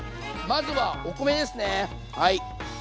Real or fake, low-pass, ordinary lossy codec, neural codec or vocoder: real; none; none; none